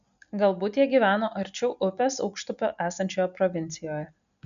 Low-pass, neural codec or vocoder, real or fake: 7.2 kHz; none; real